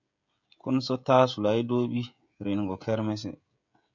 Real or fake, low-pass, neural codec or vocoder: fake; 7.2 kHz; codec, 16 kHz, 16 kbps, FreqCodec, smaller model